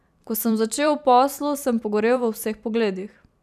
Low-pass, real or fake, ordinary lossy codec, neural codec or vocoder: 14.4 kHz; fake; none; vocoder, 44.1 kHz, 128 mel bands every 512 samples, BigVGAN v2